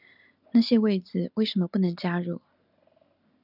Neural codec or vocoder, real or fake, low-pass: none; real; 5.4 kHz